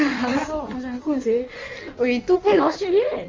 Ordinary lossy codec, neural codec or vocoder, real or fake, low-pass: Opus, 32 kbps; codec, 16 kHz in and 24 kHz out, 1.1 kbps, FireRedTTS-2 codec; fake; 7.2 kHz